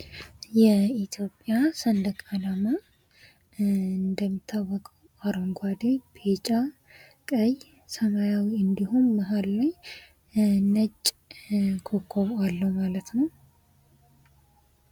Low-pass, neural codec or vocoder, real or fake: 19.8 kHz; none; real